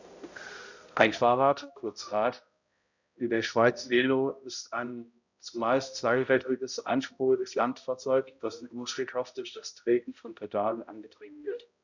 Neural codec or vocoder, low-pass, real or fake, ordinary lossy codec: codec, 16 kHz, 0.5 kbps, X-Codec, HuBERT features, trained on balanced general audio; 7.2 kHz; fake; none